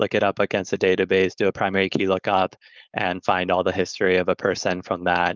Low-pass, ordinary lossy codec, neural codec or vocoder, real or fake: 7.2 kHz; Opus, 32 kbps; codec, 16 kHz, 4.8 kbps, FACodec; fake